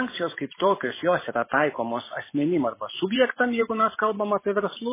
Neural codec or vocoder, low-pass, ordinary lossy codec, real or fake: vocoder, 44.1 kHz, 128 mel bands every 512 samples, BigVGAN v2; 3.6 kHz; MP3, 16 kbps; fake